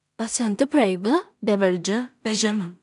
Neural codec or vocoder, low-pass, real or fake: codec, 16 kHz in and 24 kHz out, 0.4 kbps, LongCat-Audio-Codec, two codebook decoder; 10.8 kHz; fake